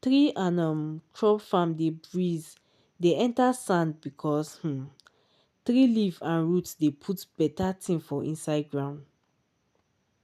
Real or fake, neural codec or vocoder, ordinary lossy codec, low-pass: real; none; none; 14.4 kHz